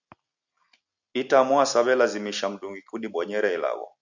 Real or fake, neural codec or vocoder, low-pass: real; none; 7.2 kHz